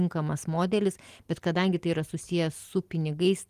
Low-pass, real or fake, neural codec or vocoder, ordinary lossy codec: 14.4 kHz; real; none; Opus, 24 kbps